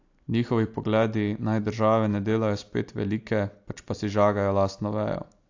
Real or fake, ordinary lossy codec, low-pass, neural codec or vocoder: real; AAC, 48 kbps; 7.2 kHz; none